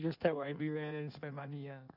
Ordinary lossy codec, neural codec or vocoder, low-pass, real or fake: none; codec, 16 kHz in and 24 kHz out, 1.1 kbps, FireRedTTS-2 codec; 5.4 kHz; fake